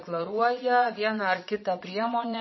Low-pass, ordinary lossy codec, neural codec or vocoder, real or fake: 7.2 kHz; MP3, 24 kbps; vocoder, 22.05 kHz, 80 mel bands, Vocos; fake